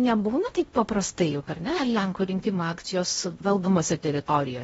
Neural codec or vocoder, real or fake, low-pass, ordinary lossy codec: codec, 16 kHz in and 24 kHz out, 0.6 kbps, FocalCodec, streaming, 2048 codes; fake; 10.8 kHz; AAC, 24 kbps